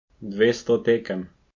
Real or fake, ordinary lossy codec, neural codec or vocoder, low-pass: real; MP3, 48 kbps; none; 7.2 kHz